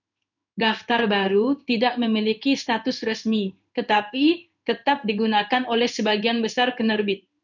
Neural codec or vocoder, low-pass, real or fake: codec, 16 kHz in and 24 kHz out, 1 kbps, XY-Tokenizer; 7.2 kHz; fake